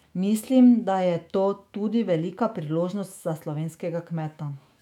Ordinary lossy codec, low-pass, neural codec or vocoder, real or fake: none; 19.8 kHz; autoencoder, 48 kHz, 128 numbers a frame, DAC-VAE, trained on Japanese speech; fake